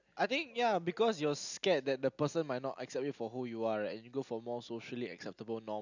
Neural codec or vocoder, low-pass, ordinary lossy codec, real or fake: none; 7.2 kHz; none; real